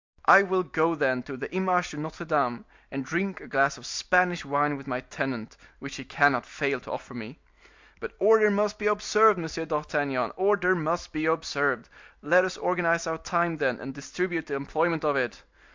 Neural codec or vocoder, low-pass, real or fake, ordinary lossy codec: none; 7.2 kHz; real; MP3, 64 kbps